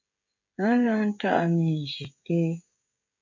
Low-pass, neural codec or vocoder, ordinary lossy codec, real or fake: 7.2 kHz; codec, 16 kHz, 16 kbps, FreqCodec, smaller model; MP3, 48 kbps; fake